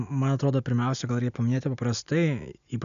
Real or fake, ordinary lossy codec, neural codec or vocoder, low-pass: real; MP3, 96 kbps; none; 7.2 kHz